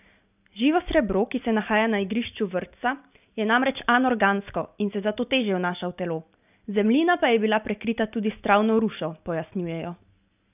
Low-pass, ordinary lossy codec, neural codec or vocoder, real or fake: 3.6 kHz; none; none; real